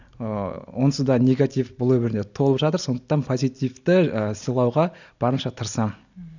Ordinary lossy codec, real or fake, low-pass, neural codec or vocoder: none; real; 7.2 kHz; none